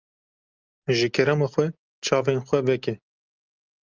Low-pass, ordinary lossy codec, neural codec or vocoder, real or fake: 7.2 kHz; Opus, 24 kbps; none; real